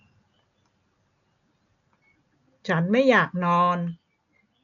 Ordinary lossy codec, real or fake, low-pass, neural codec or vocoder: none; real; 7.2 kHz; none